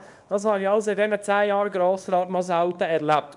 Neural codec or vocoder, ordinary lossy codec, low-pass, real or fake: codec, 24 kHz, 0.9 kbps, WavTokenizer, small release; none; 10.8 kHz; fake